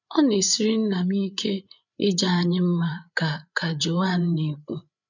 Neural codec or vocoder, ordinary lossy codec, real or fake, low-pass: codec, 16 kHz, 8 kbps, FreqCodec, larger model; none; fake; 7.2 kHz